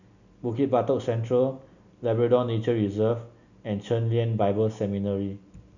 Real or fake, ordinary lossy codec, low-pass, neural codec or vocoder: real; none; 7.2 kHz; none